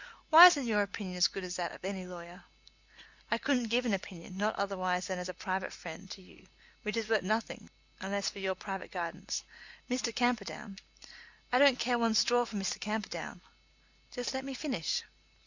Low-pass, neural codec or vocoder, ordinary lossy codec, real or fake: 7.2 kHz; none; Opus, 64 kbps; real